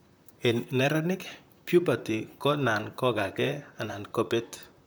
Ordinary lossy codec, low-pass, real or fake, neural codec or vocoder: none; none; fake; vocoder, 44.1 kHz, 128 mel bands, Pupu-Vocoder